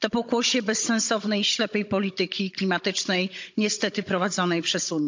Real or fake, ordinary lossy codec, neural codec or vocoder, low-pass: fake; MP3, 64 kbps; codec, 16 kHz, 16 kbps, FunCodec, trained on Chinese and English, 50 frames a second; 7.2 kHz